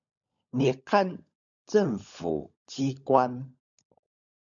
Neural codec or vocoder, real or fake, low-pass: codec, 16 kHz, 16 kbps, FunCodec, trained on LibriTTS, 50 frames a second; fake; 7.2 kHz